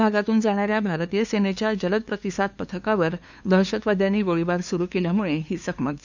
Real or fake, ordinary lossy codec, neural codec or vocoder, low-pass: fake; none; codec, 16 kHz, 2 kbps, FunCodec, trained on LibriTTS, 25 frames a second; 7.2 kHz